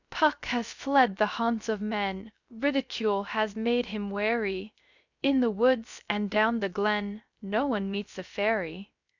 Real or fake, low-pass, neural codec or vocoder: fake; 7.2 kHz; codec, 16 kHz, 0.3 kbps, FocalCodec